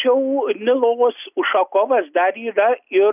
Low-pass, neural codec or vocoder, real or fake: 3.6 kHz; none; real